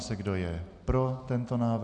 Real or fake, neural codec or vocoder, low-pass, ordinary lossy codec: real; none; 9.9 kHz; MP3, 96 kbps